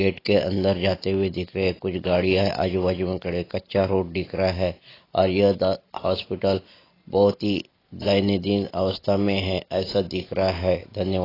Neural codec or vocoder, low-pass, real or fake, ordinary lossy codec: none; 5.4 kHz; real; AAC, 24 kbps